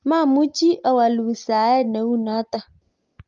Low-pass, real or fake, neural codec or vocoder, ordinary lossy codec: 7.2 kHz; real; none; Opus, 32 kbps